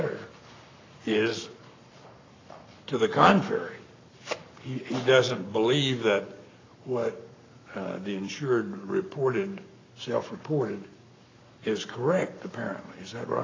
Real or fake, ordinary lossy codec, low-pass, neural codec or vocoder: fake; AAC, 32 kbps; 7.2 kHz; codec, 44.1 kHz, 7.8 kbps, Pupu-Codec